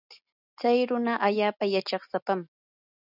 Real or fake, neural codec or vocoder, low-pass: real; none; 5.4 kHz